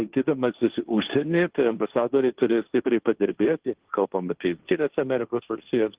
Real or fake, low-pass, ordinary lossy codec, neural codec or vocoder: fake; 3.6 kHz; Opus, 16 kbps; codec, 16 kHz, 1.1 kbps, Voila-Tokenizer